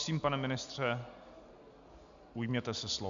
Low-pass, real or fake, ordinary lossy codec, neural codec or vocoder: 7.2 kHz; real; AAC, 64 kbps; none